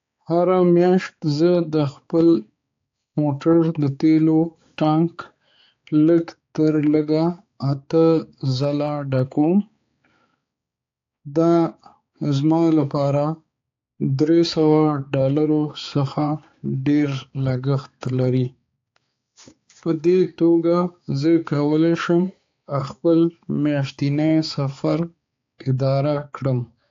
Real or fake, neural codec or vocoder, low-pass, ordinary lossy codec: fake; codec, 16 kHz, 4 kbps, X-Codec, HuBERT features, trained on balanced general audio; 7.2 kHz; AAC, 48 kbps